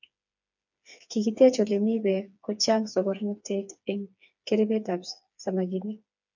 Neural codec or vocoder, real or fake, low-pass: codec, 16 kHz, 4 kbps, FreqCodec, smaller model; fake; 7.2 kHz